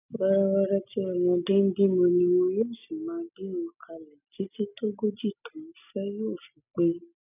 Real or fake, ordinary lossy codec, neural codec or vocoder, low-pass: real; none; none; 3.6 kHz